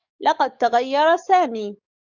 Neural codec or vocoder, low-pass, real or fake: codec, 44.1 kHz, 7.8 kbps, DAC; 7.2 kHz; fake